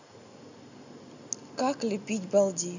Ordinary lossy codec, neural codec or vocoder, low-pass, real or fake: none; none; 7.2 kHz; real